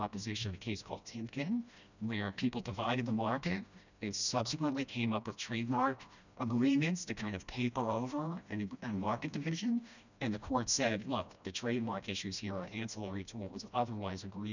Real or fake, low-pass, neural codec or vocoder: fake; 7.2 kHz; codec, 16 kHz, 1 kbps, FreqCodec, smaller model